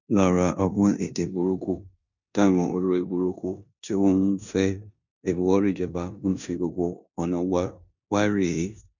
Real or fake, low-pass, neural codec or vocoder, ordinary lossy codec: fake; 7.2 kHz; codec, 16 kHz in and 24 kHz out, 0.9 kbps, LongCat-Audio-Codec, four codebook decoder; none